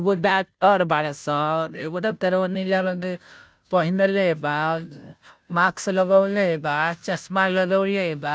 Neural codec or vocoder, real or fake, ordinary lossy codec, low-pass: codec, 16 kHz, 0.5 kbps, FunCodec, trained on Chinese and English, 25 frames a second; fake; none; none